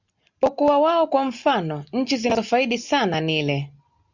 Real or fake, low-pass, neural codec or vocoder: real; 7.2 kHz; none